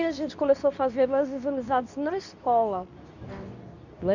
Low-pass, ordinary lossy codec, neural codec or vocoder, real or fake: 7.2 kHz; none; codec, 24 kHz, 0.9 kbps, WavTokenizer, medium speech release version 2; fake